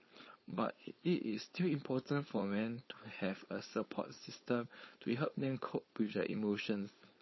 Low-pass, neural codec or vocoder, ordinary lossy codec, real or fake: 7.2 kHz; codec, 16 kHz, 4.8 kbps, FACodec; MP3, 24 kbps; fake